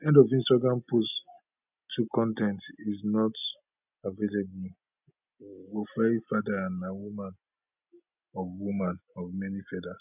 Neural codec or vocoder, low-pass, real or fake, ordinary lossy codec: none; 3.6 kHz; real; none